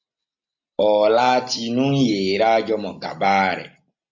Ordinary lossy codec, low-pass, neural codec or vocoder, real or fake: MP3, 48 kbps; 7.2 kHz; none; real